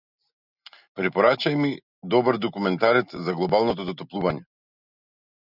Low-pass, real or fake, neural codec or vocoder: 5.4 kHz; real; none